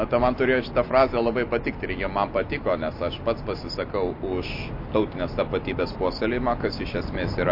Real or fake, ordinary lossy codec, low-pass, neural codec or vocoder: real; MP3, 32 kbps; 5.4 kHz; none